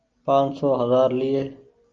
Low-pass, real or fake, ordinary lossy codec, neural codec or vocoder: 7.2 kHz; real; Opus, 24 kbps; none